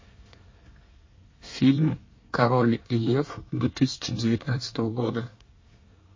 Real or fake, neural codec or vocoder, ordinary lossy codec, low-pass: fake; codec, 24 kHz, 1 kbps, SNAC; MP3, 32 kbps; 7.2 kHz